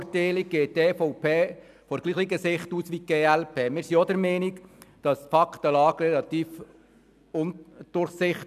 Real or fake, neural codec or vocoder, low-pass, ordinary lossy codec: real; none; 14.4 kHz; none